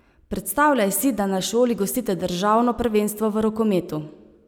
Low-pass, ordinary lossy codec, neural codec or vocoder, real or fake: none; none; none; real